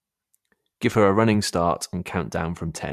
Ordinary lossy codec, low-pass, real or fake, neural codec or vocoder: MP3, 96 kbps; 14.4 kHz; fake; vocoder, 44.1 kHz, 128 mel bands every 512 samples, BigVGAN v2